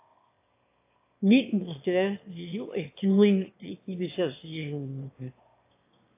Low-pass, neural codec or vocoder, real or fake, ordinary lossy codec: 3.6 kHz; autoencoder, 22.05 kHz, a latent of 192 numbers a frame, VITS, trained on one speaker; fake; AAC, 24 kbps